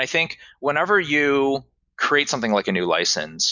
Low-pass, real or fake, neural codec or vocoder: 7.2 kHz; real; none